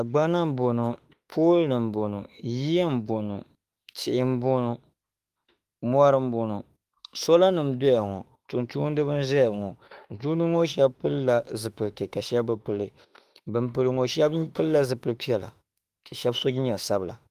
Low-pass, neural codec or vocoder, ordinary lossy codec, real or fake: 14.4 kHz; autoencoder, 48 kHz, 32 numbers a frame, DAC-VAE, trained on Japanese speech; Opus, 32 kbps; fake